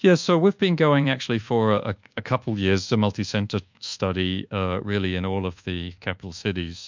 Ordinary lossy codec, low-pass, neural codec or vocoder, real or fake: MP3, 64 kbps; 7.2 kHz; codec, 24 kHz, 1.2 kbps, DualCodec; fake